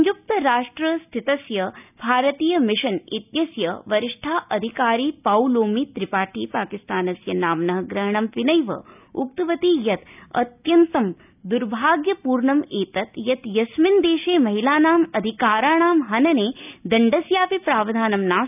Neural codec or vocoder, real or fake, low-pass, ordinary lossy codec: none; real; 3.6 kHz; none